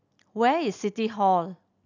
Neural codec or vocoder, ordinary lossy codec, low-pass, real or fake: none; none; 7.2 kHz; real